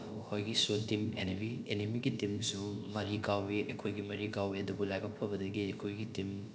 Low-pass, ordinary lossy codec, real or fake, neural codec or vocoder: none; none; fake; codec, 16 kHz, about 1 kbps, DyCAST, with the encoder's durations